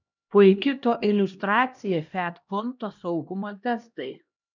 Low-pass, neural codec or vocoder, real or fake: 7.2 kHz; codec, 16 kHz, 1 kbps, X-Codec, HuBERT features, trained on LibriSpeech; fake